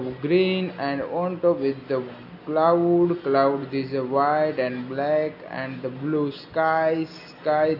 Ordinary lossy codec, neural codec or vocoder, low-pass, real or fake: none; none; 5.4 kHz; real